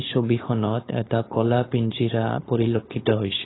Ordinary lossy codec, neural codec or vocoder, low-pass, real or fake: AAC, 16 kbps; codec, 16 kHz, 4.8 kbps, FACodec; 7.2 kHz; fake